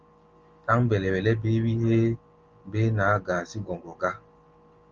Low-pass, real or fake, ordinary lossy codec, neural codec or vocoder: 7.2 kHz; real; Opus, 32 kbps; none